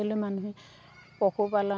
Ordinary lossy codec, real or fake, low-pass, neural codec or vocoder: none; real; none; none